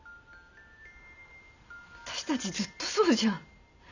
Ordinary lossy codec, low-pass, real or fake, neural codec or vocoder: none; 7.2 kHz; real; none